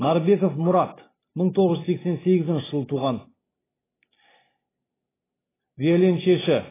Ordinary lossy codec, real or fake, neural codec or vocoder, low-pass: AAC, 16 kbps; real; none; 3.6 kHz